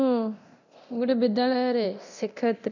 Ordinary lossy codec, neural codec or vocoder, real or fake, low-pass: none; codec, 24 kHz, 0.9 kbps, DualCodec; fake; 7.2 kHz